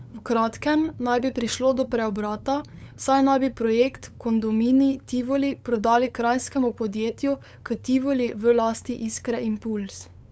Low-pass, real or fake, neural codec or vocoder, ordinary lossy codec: none; fake; codec, 16 kHz, 8 kbps, FunCodec, trained on LibriTTS, 25 frames a second; none